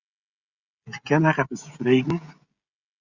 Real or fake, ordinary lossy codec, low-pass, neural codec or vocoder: fake; Opus, 64 kbps; 7.2 kHz; codec, 16 kHz, 16 kbps, FreqCodec, smaller model